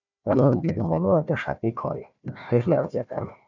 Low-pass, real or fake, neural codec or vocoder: 7.2 kHz; fake; codec, 16 kHz, 1 kbps, FunCodec, trained on Chinese and English, 50 frames a second